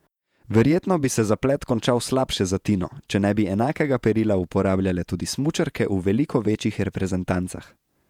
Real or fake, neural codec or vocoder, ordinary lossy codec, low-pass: fake; vocoder, 48 kHz, 128 mel bands, Vocos; none; 19.8 kHz